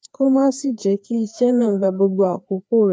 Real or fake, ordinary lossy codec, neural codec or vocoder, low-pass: fake; none; codec, 16 kHz, 2 kbps, FreqCodec, larger model; none